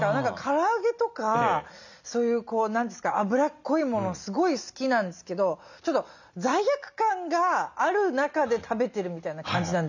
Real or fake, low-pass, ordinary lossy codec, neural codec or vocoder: real; 7.2 kHz; none; none